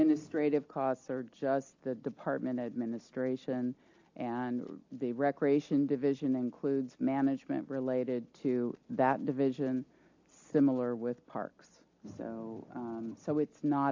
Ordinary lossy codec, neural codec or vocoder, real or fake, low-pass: AAC, 48 kbps; none; real; 7.2 kHz